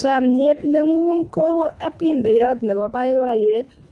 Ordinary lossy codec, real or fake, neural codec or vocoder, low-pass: none; fake; codec, 24 kHz, 1.5 kbps, HILCodec; none